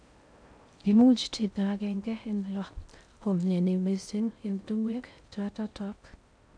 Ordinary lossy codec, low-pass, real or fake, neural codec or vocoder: none; 9.9 kHz; fake; codec, 16 kHz in and 24 kHz out, 0.6 kbps, FocalCodec, streaming, 2048 codes